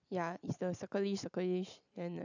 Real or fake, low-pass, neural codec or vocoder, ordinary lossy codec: real; 7.2 kHz; none; none